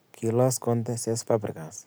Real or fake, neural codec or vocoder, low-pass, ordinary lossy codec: real; none; none; none